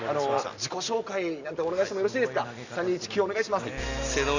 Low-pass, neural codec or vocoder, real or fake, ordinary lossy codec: 7.2 kHz; none; real; none